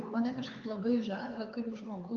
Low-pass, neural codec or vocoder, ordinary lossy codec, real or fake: 7.2 kHz; codec, 16 kHz, 4 kbps, X-Codec, HuBERT features, trained on LibriSpeech; Opus, 24 kbps; fake